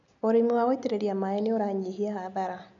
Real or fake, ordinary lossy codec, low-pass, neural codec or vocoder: real; none; 7.2 kHz; none